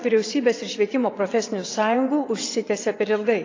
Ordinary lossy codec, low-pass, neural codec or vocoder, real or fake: AAC, 32 kbps; 7.2 kHz; vocoder, 22.05 kHz, 80 mel bands, WaveNeXt; fake